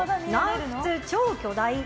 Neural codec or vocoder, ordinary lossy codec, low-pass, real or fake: none; none; none; real